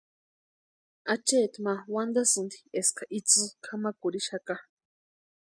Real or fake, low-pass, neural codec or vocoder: real; 9.9 kHz; none